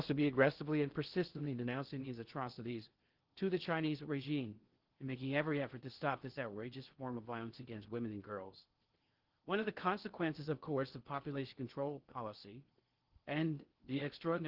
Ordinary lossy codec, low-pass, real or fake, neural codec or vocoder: Opus, 16 kbps; 5.4 kHz; fake; codec, 16 kHz in and 24 kHz out, 0.6 kbps, FocalCodec, streaming, 2048 codes